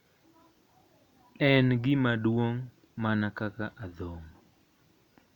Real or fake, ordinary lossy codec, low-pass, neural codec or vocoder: real; none; 19.8 kHz; none